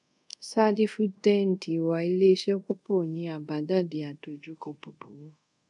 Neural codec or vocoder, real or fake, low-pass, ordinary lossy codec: codec, 24 kHz, 0.5 kbps, DualCodec; fake; 10.8 kHz; none